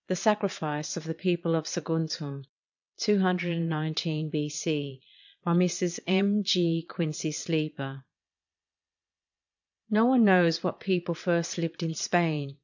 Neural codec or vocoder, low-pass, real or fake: vocoder, 22.05 kHz, 80 mel bands, Vocos; 7.2 kHz; fake